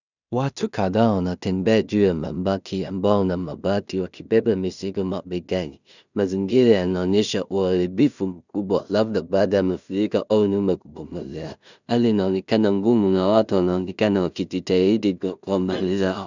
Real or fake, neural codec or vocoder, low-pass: fake; codec, 16 kHz in and 24 kHz out, 0.4 kbps, LongCat-Audio-Codec, two codebook decoder; 7.2 kHz